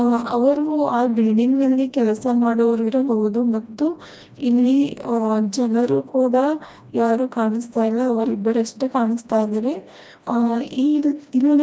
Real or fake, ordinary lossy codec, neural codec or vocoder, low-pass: fake; none; codec, 16 kHz, 1 kbps, FreqCodec, smaller model; none